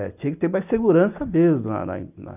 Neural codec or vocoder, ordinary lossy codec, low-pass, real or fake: none; none; 3.6 kHz; real